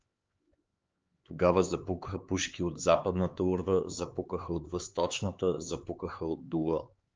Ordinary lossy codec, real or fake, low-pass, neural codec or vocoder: Opus, 24 kbps; fake; 7.2 kHz; codec, 16 kHz, 4 kbps, X-Codec, HuBERT features, trained on LibriSpeech